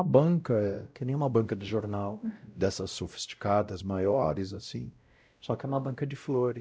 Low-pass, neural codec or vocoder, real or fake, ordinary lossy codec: none; codec, 16 kHz, 0.5 kbps, X-Codec, WavLM features, trained on Multilingual LibriSpeech; fake; none